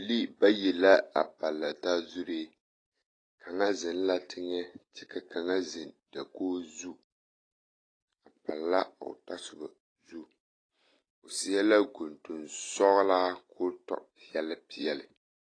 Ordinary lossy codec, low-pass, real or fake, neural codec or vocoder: AAC, 32 kbps; 9.9 kHz; real; none